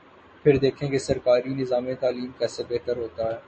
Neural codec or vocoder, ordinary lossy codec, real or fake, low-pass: none; MP3, 32 kbps; real; 10.8 kHz